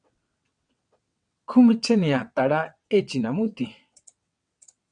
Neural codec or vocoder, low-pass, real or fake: vocoder, 22.05 kHz, 80 mel bands, WaveNeXt; 9.9 kHz; fake